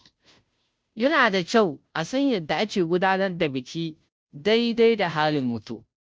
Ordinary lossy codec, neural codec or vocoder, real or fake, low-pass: none; codec, 16 kHz, 0.5 kbps, FunCodec, trained on Chinese and English, 25 frames a second; fake; none